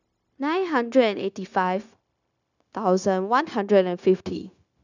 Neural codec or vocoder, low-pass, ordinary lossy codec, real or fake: codec, 16 kHz, 0.9 kbps, LongCat-Audio-Codec; 7.2 kHz; none; fake